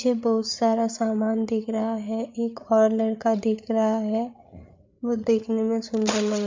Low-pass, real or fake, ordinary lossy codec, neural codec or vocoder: 7.2 kHz; fake; MP3, 64 kbps; codec, 16 kHz, 8 kbps, FreqCodec, larger model